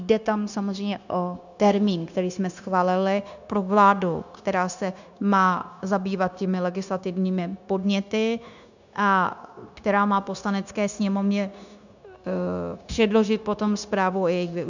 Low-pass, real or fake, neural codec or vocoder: 7.2 kHz; fake; codec, 16 kHz, 0.9 kbps, LongCat-Audio-Codec